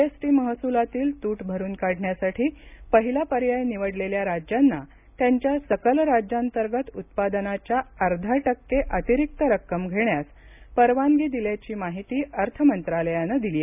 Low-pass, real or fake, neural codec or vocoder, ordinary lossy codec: 3.6 kHz; real; none; none